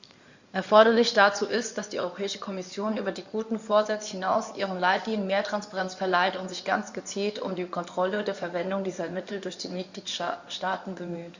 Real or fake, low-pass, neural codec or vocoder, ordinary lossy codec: fake; 7.2 kHz; codec, 16 kHz in and 24 kHz out, 2.2 kbps, FireRedTTS-2 codec; Opus, 64 kbps